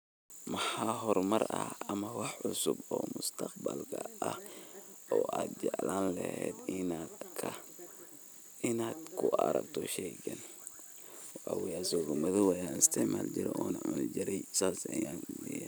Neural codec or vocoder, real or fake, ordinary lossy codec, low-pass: none; real; none; none